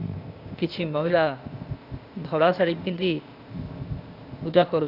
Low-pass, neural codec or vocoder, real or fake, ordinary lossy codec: 5.4 kHz; codec, 16 kHz, 0.8 kbps, ZipCodec; fake; Opus, 64 kbps